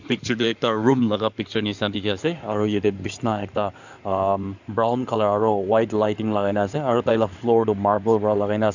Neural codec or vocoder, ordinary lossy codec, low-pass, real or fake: codec, 16 kHz in and 24 kHz out, 2.2 kbps, FireRedTTS-2 codec; none; 7.2 kHz; fake